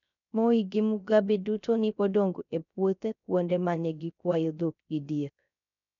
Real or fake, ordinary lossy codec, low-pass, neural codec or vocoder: fake; none; 7.2 kHz; codec, 16 kHz, 0.3 kbps, FocalCodec